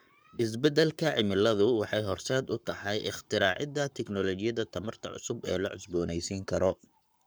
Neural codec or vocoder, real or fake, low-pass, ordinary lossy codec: codec, 44.1 kHz, 7.8 kbps, Pupu-Codec; fake; none; none